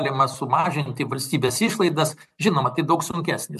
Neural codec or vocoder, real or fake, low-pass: vocoder, 44.1 kHz, 128 mel bands every 256 samples, BigVGAN v2; fake; 14.4 kHz